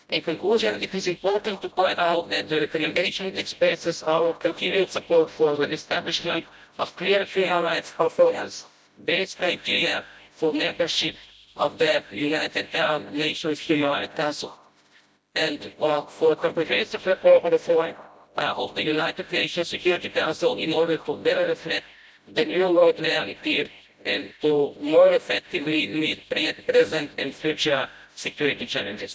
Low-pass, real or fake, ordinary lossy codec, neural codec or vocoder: none; fake; none; codec, 16 kHz, 0.5 kbps, FreqCodec, smaller model